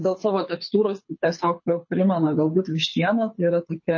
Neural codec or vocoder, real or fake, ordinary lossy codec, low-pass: codec, 24 kHz, 6 kbps, HILCodec; fake; MP3, 32 kbps; 7.2 kHz